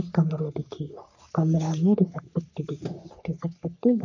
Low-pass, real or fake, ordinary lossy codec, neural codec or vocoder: 7.2 kHz; fake; none; codec, 44.1 kHz, 3.4 kbps, Pupu-Codec